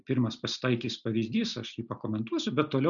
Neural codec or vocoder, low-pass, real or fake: none; 7.2 kHz; real